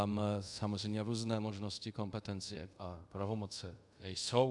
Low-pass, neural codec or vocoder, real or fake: 10.8 kHz; codec, 24 kHz, 0.5 kbps, DualCodec; fake